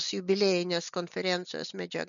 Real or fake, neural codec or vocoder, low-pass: real; none; 7.2 kHz